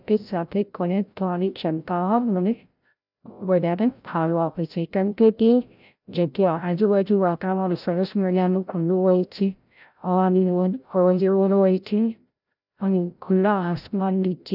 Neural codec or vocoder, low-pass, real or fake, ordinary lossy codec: codec, 16 kHz, 0.5 kbps, FreqCodec, larger model; 5.4 kHz; fake; none